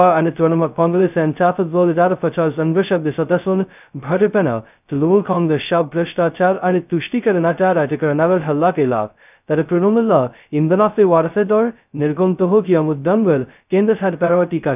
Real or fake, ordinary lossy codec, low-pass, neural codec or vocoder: fake; none; 3.6 kHz; codec, 16 kHz, 0.2 kbps, FocalCodec